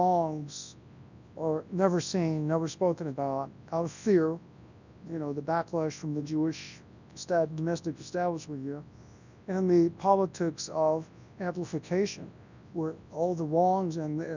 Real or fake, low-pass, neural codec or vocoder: fake; 7.2 kHz; codec, 24 kHz, 0.9 kbps, WavTokenizer, large speech release